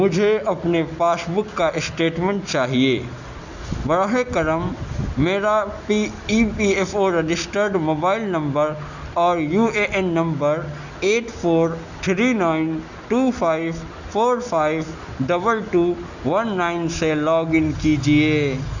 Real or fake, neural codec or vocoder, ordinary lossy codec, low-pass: real; none; none; 7.2 kHz